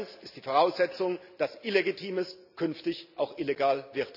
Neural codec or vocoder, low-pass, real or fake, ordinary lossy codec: none; 5.4 kHz; real; none